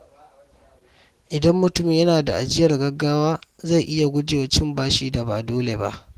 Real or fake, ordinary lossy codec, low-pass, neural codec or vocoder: real; Opus, 16 kbps; 14.4 kHz; none